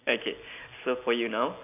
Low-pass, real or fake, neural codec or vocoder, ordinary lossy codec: 3.6 kHz; real; none; none